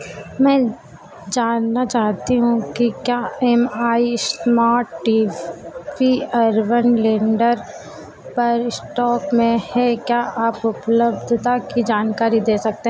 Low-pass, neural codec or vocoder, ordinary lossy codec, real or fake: none; none; none; real